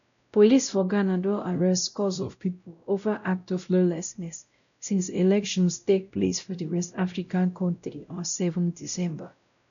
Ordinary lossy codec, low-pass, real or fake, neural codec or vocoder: none; 7.2 kHz; fake; codec, 16 kHz, 0.5 kbps, X-Codec, WavLM features, trained on Multilingual LibriSpeech